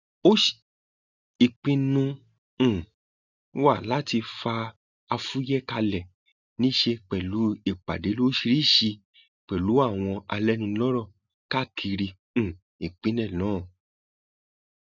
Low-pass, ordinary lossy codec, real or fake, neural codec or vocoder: 7.2 kHz; none; real; none